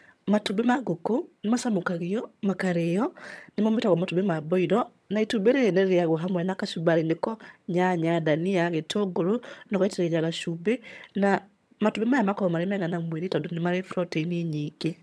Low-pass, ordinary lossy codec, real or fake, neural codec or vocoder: none; none; fake; vocoder, 22.05 kHz, 80 mel bands, HiFi-GAN